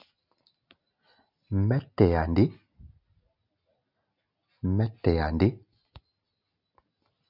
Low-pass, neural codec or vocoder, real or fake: 5.4 kHz; none; real